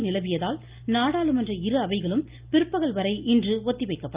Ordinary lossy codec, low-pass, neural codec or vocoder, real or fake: Opus, 32 kbps; 3.6 kHz; none; real